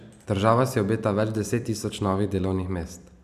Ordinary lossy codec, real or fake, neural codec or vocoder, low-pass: none; fake; vocoder, 44.1 kHz, 128 mel bands every 256 samples, BigVGAN v2; 14.4 kHz